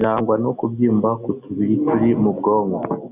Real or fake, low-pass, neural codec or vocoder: real; 3.6 kHz; none